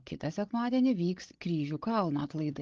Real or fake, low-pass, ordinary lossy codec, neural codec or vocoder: fake; 7.2 kHz; Opus, 16 kbps; codec, 16 kHz, 16 kbps, FreqCodec, larger model